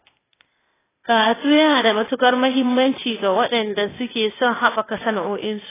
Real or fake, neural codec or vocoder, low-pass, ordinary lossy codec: fake; codec, 16 kHz, 0.8 kbps, ZipCodec; 3.6 kHz; AAC, 16 kbps